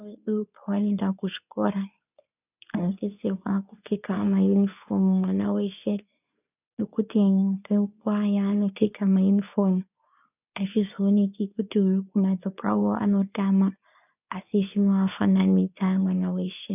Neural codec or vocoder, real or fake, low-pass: codec, 24 kHz, 0.9 kbps, WavTokenizer, medium speech release version 2; fake; 3.6 kHz